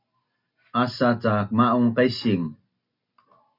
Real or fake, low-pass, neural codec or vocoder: real; 5.4 kHz; none